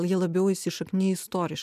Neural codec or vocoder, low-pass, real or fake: none; 14.4 kHz; real